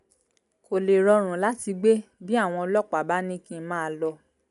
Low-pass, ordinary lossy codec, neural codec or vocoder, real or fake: 10.8 kHz; none; none; real